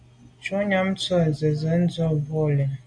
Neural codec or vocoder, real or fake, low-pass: none; real; 9.9 kHz